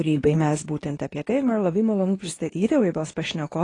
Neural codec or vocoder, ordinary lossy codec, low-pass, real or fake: codec, 24 kHz, 0.9 kbps, WavTokenizer, medium speech release version 2; AAC, 32 kbps; 10.8 kHz; fake